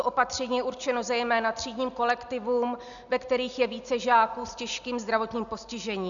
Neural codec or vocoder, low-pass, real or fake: none; 7.2 kHz; real